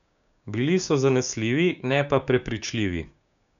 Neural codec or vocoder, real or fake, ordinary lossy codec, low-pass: codec, 16 kHz, 6 kbps, DAC; fake; none; 7.2 kHz